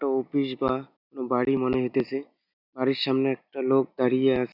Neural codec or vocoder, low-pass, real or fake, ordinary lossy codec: none; 5.4 kHz; real; none